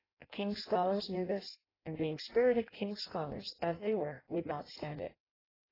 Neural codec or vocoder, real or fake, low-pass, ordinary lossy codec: codec, 16 kHz in and 24 kHz out, 0.6 kbps, FireRedTTS-2 codec; fake; 5.4 kHz; AAC, 24 kbps